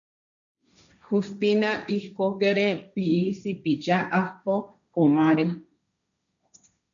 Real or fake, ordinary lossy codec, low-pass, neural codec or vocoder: fake; MP3, 96 kbps; 7.2 kHz; codec, 16 kHz, 1.1 kbps, Voila-Tokenizer